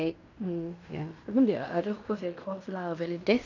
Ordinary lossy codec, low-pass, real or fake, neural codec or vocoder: none; 7.2 kHz; fake; codec, 16 kHz in and 24 kHz out, 0.9 kbps, LongCat-Audio-Codec, fine tuned four codebook decoder